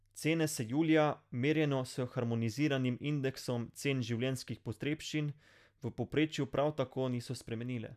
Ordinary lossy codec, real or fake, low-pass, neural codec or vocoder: none; real; 14.4 kHz; none